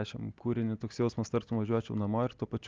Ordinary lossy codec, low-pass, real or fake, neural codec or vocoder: Opus, 24 kbps; 7.2 kHz; real; none